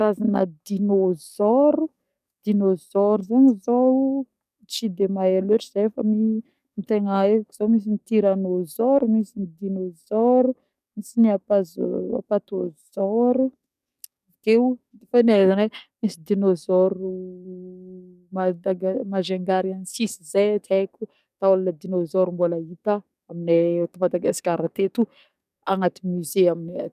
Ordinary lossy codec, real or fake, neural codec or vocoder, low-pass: none; fake; codec, 44.1 kHz, 7.8 kbps, DAC; 14.4 kHz